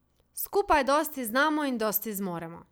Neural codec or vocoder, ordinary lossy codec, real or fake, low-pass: none; none; real; none